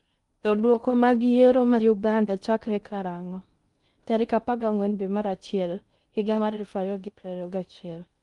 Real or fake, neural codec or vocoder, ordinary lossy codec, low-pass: fake; codec, 16 kHz in and 24 kHz out, 0.6 kbps, FocalCodec, streaming, 2048 codes; Opus, 32 kbps; 10.8 kHz